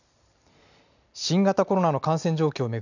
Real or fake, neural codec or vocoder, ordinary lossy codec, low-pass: real; none; none; 7.2 kHz